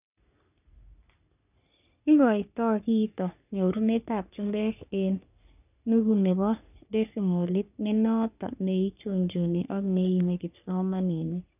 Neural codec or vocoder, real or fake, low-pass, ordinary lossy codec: codec, 44.1 kHz, 3.4 kbps, Pupu-Codec; fake; 3.6 kHz; none